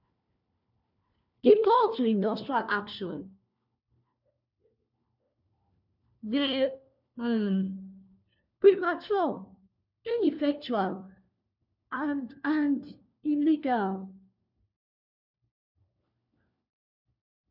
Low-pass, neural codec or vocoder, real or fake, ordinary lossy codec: 5.4 kHz; codec, 16 kHz, 1 kbps, FunCodec, trained on LibriTTS, 50 frames a second; fake; none